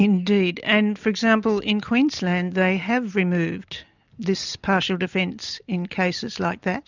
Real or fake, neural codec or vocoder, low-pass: real; none; 7.2 kHz